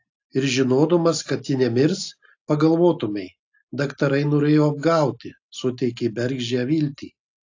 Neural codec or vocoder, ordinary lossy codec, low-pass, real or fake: none; AAC, 48 kbps; 7.2 kHz; real